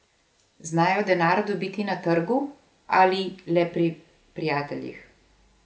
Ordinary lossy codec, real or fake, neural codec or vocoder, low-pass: none; real; none; none